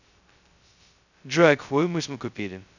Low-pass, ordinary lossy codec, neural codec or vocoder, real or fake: 7.2 kHz; none; codec, 16 kHz, 0.2 kbps, FocalCodec; fake